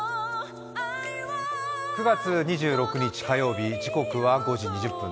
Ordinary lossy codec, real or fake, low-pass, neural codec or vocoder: none; real; none; none